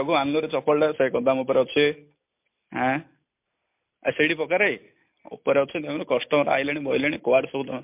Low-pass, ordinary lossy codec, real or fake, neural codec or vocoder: 3.6 kHz; MP3, 32 kbps; real; none